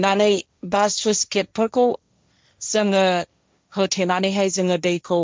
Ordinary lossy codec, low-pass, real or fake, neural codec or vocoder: none; none; fake; codec, 16 kHz, 1.1 kbps, Voila-Tokenizer